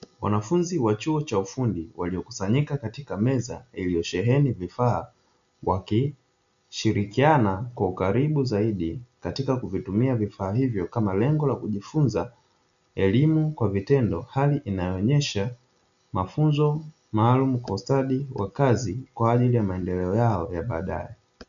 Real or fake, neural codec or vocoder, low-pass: real; none; 7.2 kHz